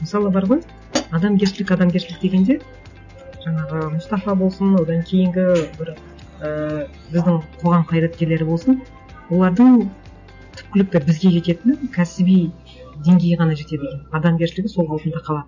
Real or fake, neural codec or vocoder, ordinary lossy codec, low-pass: real; none; none; 7.2 kHz